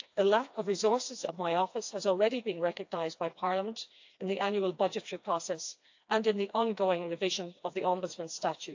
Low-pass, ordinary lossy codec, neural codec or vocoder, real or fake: 7.2 kHz; AAC, 48 kbps; codec, 16 kHz, 2 kbps, FreqCodec, smaller model; fake